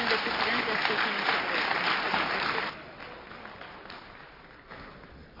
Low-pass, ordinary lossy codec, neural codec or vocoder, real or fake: 5.4 kHz; AAC, 32 kbps; none; real